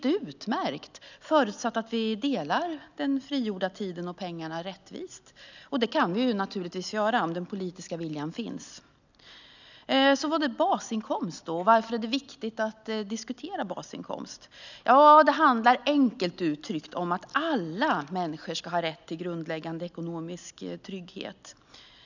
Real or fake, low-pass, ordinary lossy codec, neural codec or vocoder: real; 7.2 kHz; none; none